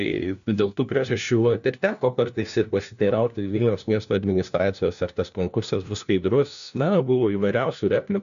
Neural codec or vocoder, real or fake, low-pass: codec, 16 kHz, 1 kbps, FunCodec, trained on LibriTTS, 50 frames a second; fake; 7.2 kHz